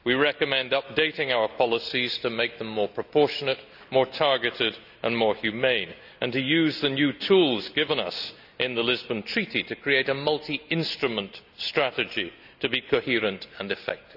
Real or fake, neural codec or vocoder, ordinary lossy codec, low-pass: real; none; none; 5.4 kHz